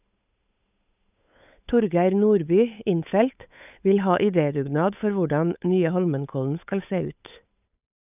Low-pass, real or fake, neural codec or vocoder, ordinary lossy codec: 3.6 kHz; fake; codec, 16 kHz, 8 kbps, FunCodec, trained on Chinese and English, 25 frames a second; none